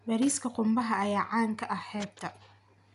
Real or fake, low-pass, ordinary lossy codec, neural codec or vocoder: real; 10.8 kHz; none; none